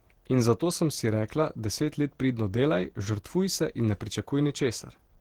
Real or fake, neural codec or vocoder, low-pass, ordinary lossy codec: fake; vocoder, 48 kHz, 128 mel bands, Vocos; 19.8 kHz; Opus, 16 kbps